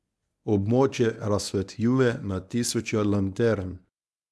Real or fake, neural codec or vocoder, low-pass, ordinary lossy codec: fake; codec, 24 kHz, 0.9 kbps, WavTokenizer, medium speech release version 1; none; none